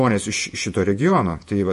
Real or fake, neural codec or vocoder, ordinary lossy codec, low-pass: fake; vocoder, 48 kHz, 128 mel bands, Vocos; MP3, 48 kbps; 14.4 kHz